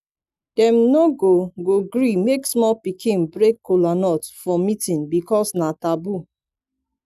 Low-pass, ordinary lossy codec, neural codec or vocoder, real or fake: 14.4 kHz; none; none; real